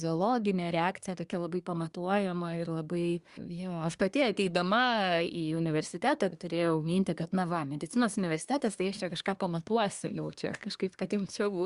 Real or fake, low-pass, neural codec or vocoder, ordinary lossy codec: fake; 10.8 kHz; codec, 24 kHz, 1 kbps, SNAC; Opus, 64 kbps